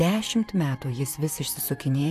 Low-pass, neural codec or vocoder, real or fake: 14.4 kHz; vocoder, 44.1 kHz, 128 mel bands, Pupu-Vocoder; fake